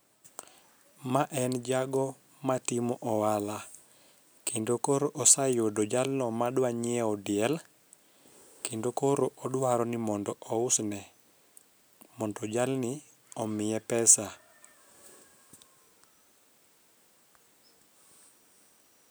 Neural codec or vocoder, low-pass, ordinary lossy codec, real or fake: none; none; none; real